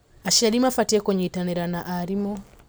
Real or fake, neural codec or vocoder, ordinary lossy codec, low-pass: real; none; none; none